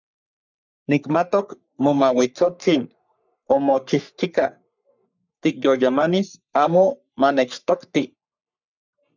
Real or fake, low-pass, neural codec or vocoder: fake; 7.2 kHz; codec, 44.1 kHz, 3.4 kbps, Pupu-Codec